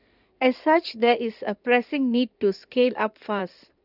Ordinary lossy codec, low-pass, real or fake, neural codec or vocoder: none; 5.4 kHz; fake; codec, 16 kHz in and 24 kHz out, 2.2 kbps, FireRedTTS-2 codec